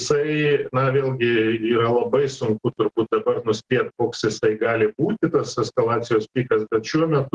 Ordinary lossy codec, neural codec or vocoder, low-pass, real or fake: Opus, 16 kbps; none; 10.8 kHz; real